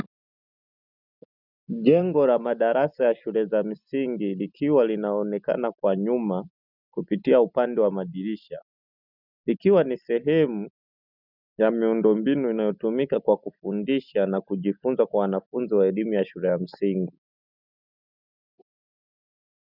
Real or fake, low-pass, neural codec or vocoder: real; 5.4 kHz; none